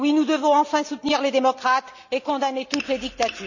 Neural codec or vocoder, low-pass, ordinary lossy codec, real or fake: none; 7.2 kHz; none; real